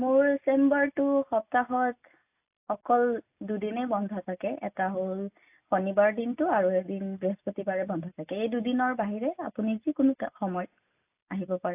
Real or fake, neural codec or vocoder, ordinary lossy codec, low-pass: real; none; AAC, 32 kbps; 3.6 kHz